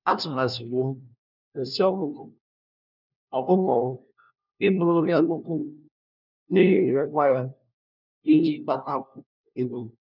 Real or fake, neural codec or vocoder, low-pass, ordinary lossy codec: fake; codec, 16 kHz, 1 kbps, FunCodec, trained on LibriTTS, 50 frames a second; 5.4 kHz; none